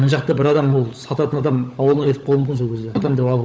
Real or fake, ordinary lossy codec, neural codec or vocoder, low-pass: fake; none; codec, 16 kHz, 16 kbps, FunCodec, trained on LibriTTS, 50 frames a second; none